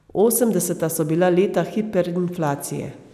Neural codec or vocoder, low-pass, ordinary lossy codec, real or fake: none; 14.4 kHz; none; real